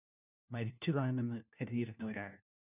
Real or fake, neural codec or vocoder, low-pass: fake; codec, 16 kHz, 1 kbps, FunCodec, trained on LibriTTS, 50 frames a second; 3.6 kHz